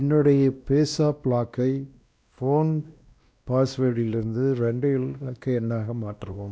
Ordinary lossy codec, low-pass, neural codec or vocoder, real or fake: none; none; codec, 16 kHz, 1 kbps, X-Codec, WavLM features, trained on Multilingual LibriSpeech; fake